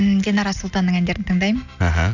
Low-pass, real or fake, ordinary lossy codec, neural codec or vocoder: 7.2 kHz; real; none; none